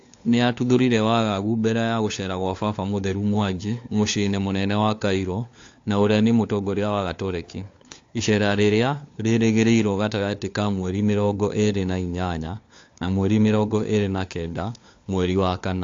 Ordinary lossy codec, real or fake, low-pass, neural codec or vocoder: AAC, 48 kbps; fake; 7.2 kHz; codec, 16 kHz, 4 kbps, FunCodec, trained on LibriTTS, 50 frames a second